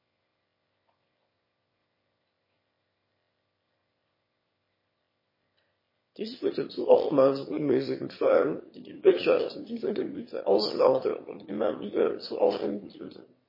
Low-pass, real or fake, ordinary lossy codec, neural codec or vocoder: 5.4 kHz; fake; MP3, 24 kbps; autoencoder, 22.05 kHz, a latent of 192 numbers a frame, VITS, trained on one speaker